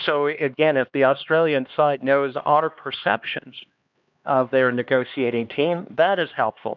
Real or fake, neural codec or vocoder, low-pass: fake; codec, 16 kHz, 2 kbps, X-Codec, HuBERT features, trained on LibriSpeech; 7.2 kHz